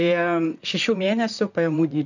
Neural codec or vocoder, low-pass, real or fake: vocoder, 44.1 kHz, 128 mel bands, Pupu-Vocoder; 7.2 kHz; fake